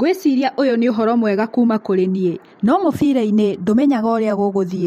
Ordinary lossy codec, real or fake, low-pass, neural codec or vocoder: MP3, 64 kbps; fake; 19.8 kHz; vocoder, 44.1 kHz, 128 mel bands every 512 samples, BigVGAN v2